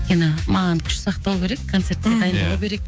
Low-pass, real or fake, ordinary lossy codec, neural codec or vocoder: none; fake; none; codec, 16 kHz, 6 kbps, DAC